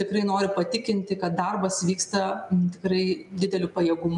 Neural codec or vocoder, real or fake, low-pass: none; real; 10.8 kHz